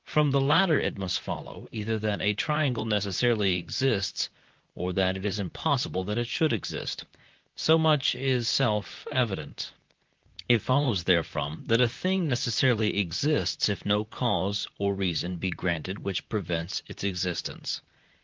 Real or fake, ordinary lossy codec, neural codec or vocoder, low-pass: fake; Opus, 32 kbps; vocoder, 44.1 kHz, 128 mel bands, Pupu-Vocoder; 7.2 kHz